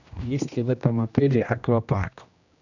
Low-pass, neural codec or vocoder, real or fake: 7.2 kHz; codec, 16 kHz, 1 kbps, X-Codec, HuBERT features, trained on general audio; fake